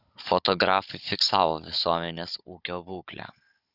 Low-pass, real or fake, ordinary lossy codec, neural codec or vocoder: 5.4 kHz; fake; Opus, 64 kbps; codec, 16 kHz, 16 kbps, FunCodec, trained on Chinese and English, 50 frames a second